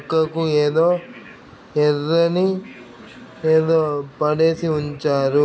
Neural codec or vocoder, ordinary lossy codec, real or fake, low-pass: none; none; real; none